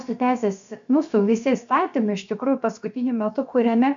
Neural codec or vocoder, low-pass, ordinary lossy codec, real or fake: codec, 16 kHz, about 1 kbps, DyCAST, with the encoder's durations; 7.2 kHz; MP3, 64 kbps; fake